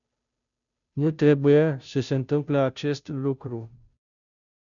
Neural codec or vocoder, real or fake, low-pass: codec, 16 kHz, 0.5 kbps, FunCodec, trained on Chinese and English, 25 frames a second; fake; 7.2 kHz